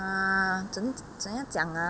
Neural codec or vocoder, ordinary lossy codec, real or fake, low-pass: none; none; real; none